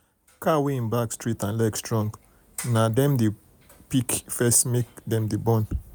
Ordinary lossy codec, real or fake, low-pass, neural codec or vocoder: none; real; none; none